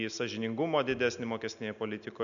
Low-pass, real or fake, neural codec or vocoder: 7.2 kHz; real; none